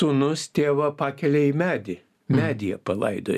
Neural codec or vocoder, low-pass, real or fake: none; 14.4 kHz; real